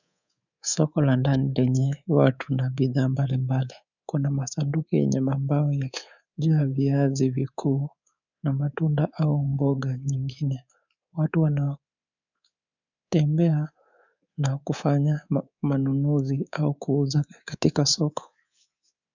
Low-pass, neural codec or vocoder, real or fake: 7.2 kHz; codec, 24 kHz, 3.1 kbps, DualCodec; fake